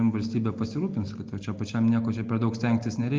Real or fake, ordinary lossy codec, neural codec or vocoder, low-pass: real; Opus, 32 kbps; none; 7.2 kHz